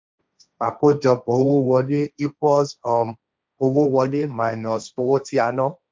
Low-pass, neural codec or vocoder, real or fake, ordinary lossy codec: none; codec, 16 kHz, 1.1 kbps, Voila-Tokenizer; fake; none